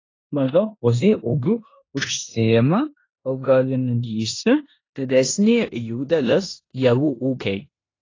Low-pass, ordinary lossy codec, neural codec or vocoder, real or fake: 7.2 kHz; AAC, 32 kbps; codec, 16 kHz in and 24 kHz out, 0.9 kbps, LongCat-Audio-Codec, four codebook decoder; fake